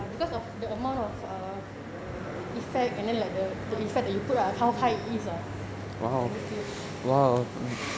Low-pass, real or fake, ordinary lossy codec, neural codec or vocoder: none; real; none; none